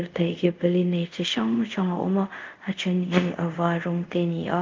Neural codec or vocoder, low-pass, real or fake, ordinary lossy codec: codec, 24 kHz, 0.5 kbps, DualCodec; 7.2 kHz; fake; Opus, 24 kbps